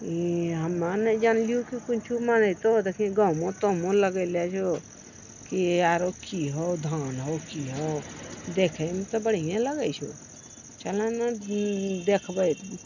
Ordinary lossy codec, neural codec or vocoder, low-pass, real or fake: none; none; 7.2 kHz; real